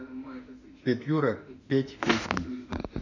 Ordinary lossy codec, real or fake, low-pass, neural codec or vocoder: none; fake; 7.2 kHz; autoencoder, 48 kHz, 32 numbers a frame, DAC-VAE, trained on Japanese speech